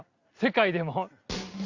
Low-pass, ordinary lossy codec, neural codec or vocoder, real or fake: 7.2 kHz; Opus, 64 kbps; none; real